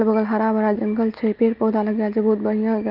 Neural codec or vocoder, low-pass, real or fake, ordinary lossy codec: none; 5.4 kHz; real; Opus, 32 kbps